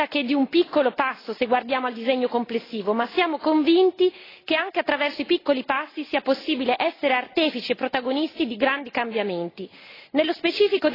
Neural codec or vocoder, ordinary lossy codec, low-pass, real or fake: none; AAC, 24 kbps; 5.4 kHz; real